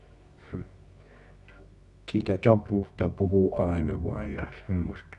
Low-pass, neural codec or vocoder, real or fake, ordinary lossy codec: 10.8 kHz; codec, 24 kHz, 0.9 kbps, WavTokenizer, medium music audio release; fake; none